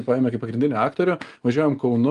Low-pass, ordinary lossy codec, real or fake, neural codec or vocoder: 10.8 kHz; Opus, 32 kbps; real; none